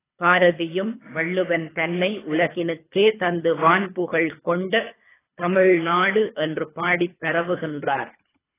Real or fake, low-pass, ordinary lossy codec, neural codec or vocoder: fake; 3.6 kHz; AAC, 16 kbps; codec, 24 kHz, 3 kbps, HILCodec